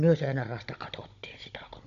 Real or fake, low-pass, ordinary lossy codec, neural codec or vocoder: fake; 7.2 kHz; none; codec, 16 kHz, 16 kbps, FunCodec, trained on Chinese and English, 50 frames a second